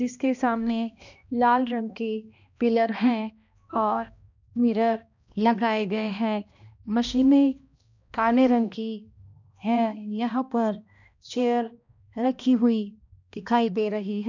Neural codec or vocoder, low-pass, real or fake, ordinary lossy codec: codec, 16 kHz, 1 kbps, X-Codec, HuBERT features, trained on balanced general audio; 7.2 kHz; fake; none